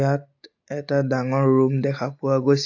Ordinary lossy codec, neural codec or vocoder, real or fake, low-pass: AAC, 48 kbps; none; real; 7.2 kHz